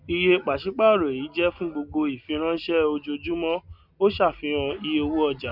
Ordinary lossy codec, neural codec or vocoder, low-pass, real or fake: none; none; 5.4 kHz; real